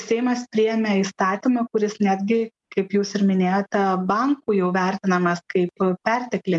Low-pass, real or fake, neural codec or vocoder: 10.8 kHz; real; none